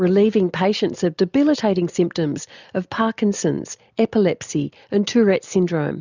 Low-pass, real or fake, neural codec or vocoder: 7.2 kHz; real; none